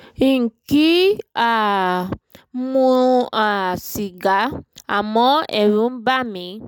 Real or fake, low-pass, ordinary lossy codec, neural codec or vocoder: real; 19.8 kHz; none; none